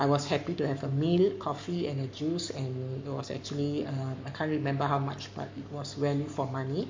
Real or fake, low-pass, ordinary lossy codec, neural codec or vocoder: fake; 7.2 kHz; MP3, 48 kbps; codec, 44.1 kHz, 7.8 kbps, Pupu-Codec